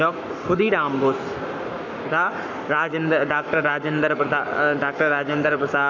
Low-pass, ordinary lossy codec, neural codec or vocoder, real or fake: 7.2 kHz; none; codec, 44.1 kHz, 7.8 kbps, Pupu-Codec; fake